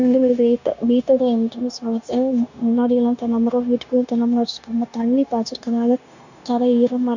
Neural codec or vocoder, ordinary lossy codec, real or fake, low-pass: codec, 16 kHz, 0.9 kbps, LongCat-Audio-Codec; none; fake; 7.2 kHz